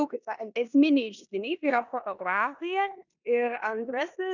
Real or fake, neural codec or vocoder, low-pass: fake; codec, 16 kHz in and 24 kHz out, 0.9 kbps, LongCat-Audio-Codec, four codebook decoder; 7.2 kHz